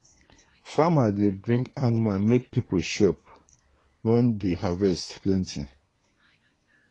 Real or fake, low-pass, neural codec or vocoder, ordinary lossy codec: fake; 10.8 kHz; codec, 24 kHz, 1 kbps, SNAC; AAC, 32 kbps